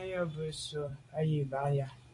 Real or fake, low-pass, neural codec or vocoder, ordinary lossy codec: fake; 10.8 kHz; vocoder, 44.1 kHz, 128 mel bands every 256 samples, BigVGAN v2; AAC, 48 kbps